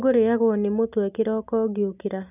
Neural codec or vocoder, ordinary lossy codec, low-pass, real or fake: none; none; 3.6 kHz; real